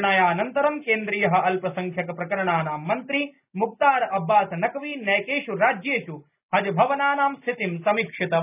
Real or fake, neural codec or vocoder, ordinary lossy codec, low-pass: real; none; none; 3.6 kHz